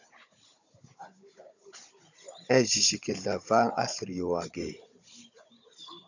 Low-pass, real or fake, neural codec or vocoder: 7.2 kHz; fake; codec, 16 kHz, 16 kbps, FunCodec, trained on Chinese and English, 50 frames a second